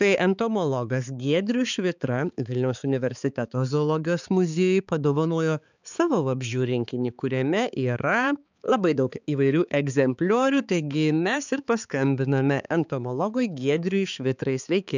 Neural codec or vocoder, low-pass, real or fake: codec, 16 kHz, 4 kbps, X-Codec, HuBERT features, trained on balanced general audio; 7.2 kHz; fake